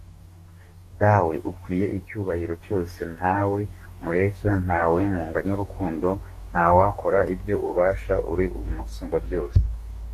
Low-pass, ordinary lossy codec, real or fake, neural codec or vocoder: 14.4 kHz; AAC, 64 kbps; fake; codec, 44.1 kHz, 2.6 kbps, DAC